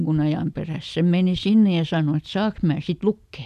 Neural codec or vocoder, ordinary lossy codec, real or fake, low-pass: none; none; real; 14.4 kHz